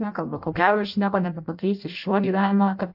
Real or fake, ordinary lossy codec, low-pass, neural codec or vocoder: fake; AAC, 48 kbps; 5.4 kHz; codec, 16 kHz in and 24 kHz out, 0.6 kbps, FireRedTTS-2 codec